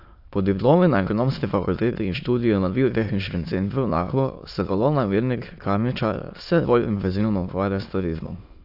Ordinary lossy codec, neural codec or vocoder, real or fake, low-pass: AAC, 48 kbps; autoencoder, 22.05 kHz, a latent of 192 numbers a frame, VITS, trained on many speakers; fake; 5.4 kHz